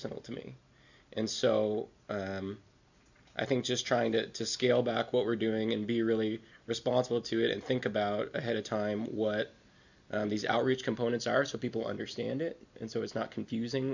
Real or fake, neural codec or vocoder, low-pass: real; none; 7.2 kHz